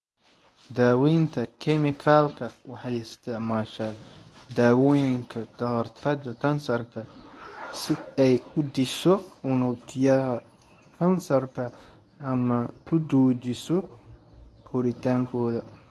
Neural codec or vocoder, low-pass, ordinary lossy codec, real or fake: codec, 24 kHz, 0.9 kbps, WavTokenizer, medium speech release version 1; none; none; fake